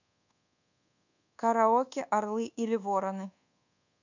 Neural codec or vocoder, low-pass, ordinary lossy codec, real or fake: codec, 24 kHz, 1.2 kbps, DualCodec; 7.2 kHz; none; fake